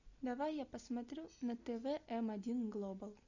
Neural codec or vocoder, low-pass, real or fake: none; 7.2 kHz; real